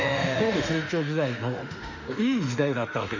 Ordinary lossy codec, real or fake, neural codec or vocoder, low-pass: none; fake; autoencoder, 48 kHz, 32 numbers a frame, DAC-VAE, trained on Japanese speech; 7.2 kHz